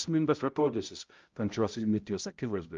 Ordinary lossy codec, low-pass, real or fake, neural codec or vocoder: Opus, 24 kbps; 7.2 kHz; fake; codec, 16 kHz, 0.5 kbps, X-Codec, HuBERT features, trained on balanced general audio